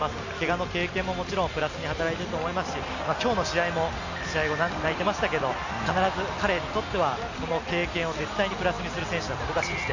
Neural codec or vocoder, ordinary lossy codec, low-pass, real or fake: none; AAC, 48 kbps; 7.2 kHz; real